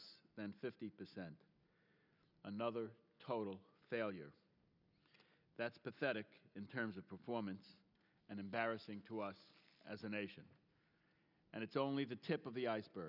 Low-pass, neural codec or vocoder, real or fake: 5.4 kHz; none; real